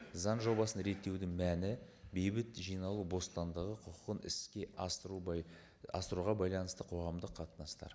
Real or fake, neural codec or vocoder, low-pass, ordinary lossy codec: real; none; none; none